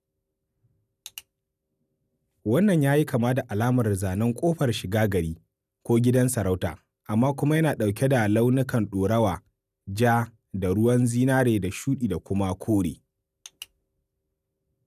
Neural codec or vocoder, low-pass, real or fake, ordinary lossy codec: none; 14.4 kHz; real; none